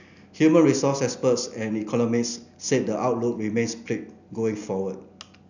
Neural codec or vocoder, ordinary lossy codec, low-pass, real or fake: none; none; 7.2 kHz; real